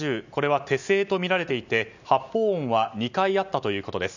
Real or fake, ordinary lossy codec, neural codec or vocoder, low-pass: real; none; none; 7.2 kHz